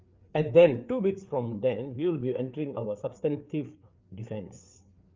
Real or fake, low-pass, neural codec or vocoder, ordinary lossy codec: fake; 7.2 kHz; codec, 16 kHz, 4 kbps, FreqCodec, larger model; Opus, 24 kbps